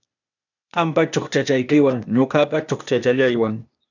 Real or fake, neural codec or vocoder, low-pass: fake; codec, 16 kHz, 0.8 kbps, ZipCodec; 7.2 kHz